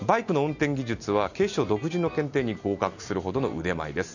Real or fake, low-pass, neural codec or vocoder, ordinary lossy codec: real; 7.2 kHz; none; none